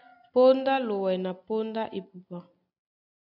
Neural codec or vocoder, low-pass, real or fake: none; 5.4 kHz; real